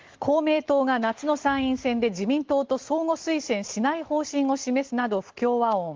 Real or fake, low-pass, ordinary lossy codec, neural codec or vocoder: real; 7.2 kHz; Opus, 16 kbps; none